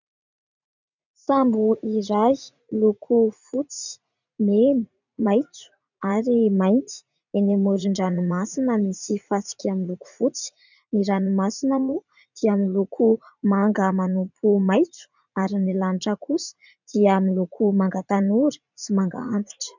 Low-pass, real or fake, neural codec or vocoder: 7.2 kHz; fake; vocoder, 44.1 kHz, 80 mel bands, Vocos